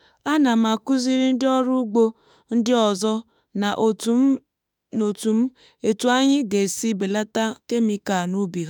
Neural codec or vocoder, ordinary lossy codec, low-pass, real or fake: autoencoder, 48 kHz, 32 numbers a frame, DAC-VAE, trained on Japanese speech; none; 19.8 kHz; fake